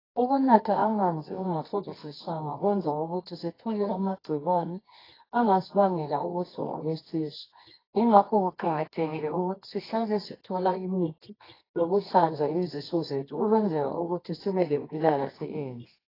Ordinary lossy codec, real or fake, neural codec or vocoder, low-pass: AAC, 24 kbps; fake; codec, 24 kHz, 0.9 kbps, WavTokenizer, medium music audio release; 5.4 kHz